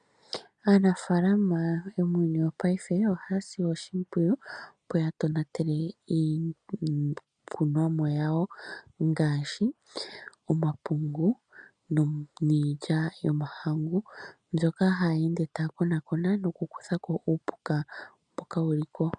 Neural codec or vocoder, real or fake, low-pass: none; real; 9.9 kHz